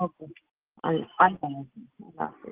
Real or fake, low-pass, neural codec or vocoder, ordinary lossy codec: real; 3.6 kHz; none; Opus, 16 kbps